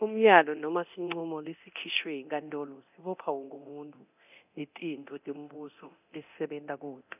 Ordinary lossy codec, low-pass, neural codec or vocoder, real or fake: none; 3.6 kHz; codec, 24 kHz, 0.9 kbps, DualCodec; fake